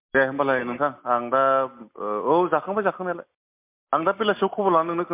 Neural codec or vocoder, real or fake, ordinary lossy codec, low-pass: none; real; MP3, 32 kbps; 3.6 kHz